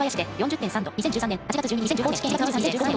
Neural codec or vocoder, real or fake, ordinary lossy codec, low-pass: none; real; none; none